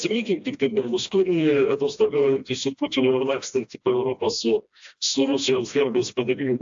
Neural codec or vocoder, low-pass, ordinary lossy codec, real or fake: codec, 16 kHz, 1 kbps, FreqCodec, smaller model; 7.2 kHz; AAC, 64 kbps; fake